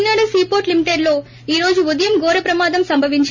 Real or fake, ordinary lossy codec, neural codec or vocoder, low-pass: real; none; none; 7.2 kHz